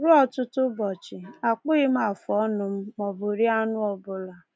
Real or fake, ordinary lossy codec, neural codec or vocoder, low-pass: real; none; none; none